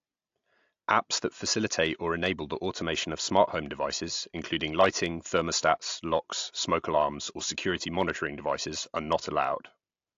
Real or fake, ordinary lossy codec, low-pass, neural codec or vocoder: real; AAC, 48 kbps; 7.2 kHz; none